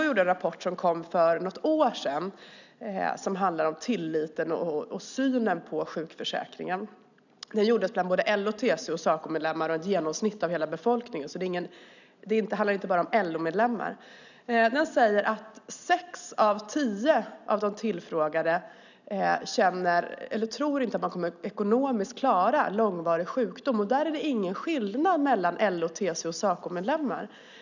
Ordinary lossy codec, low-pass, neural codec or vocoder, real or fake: none; 7.2 kHz; none; real